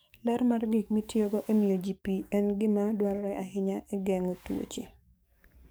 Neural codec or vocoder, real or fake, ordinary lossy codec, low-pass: codec, 44.1 kHz, 7.8 kbps, DAC; fake; none; none